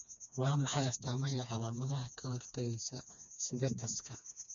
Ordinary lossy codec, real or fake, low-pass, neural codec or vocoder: none; fake; 7.2 kHz; codec, 16 kHz, 2 kbps, FreqCodec, smaller model